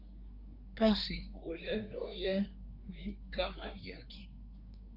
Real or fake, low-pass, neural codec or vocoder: fake; 5.4 kHz; codec, 24 kHz, 1 kbps, SNAC